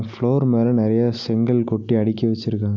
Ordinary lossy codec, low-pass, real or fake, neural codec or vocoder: none; 7.2 kHz; real; none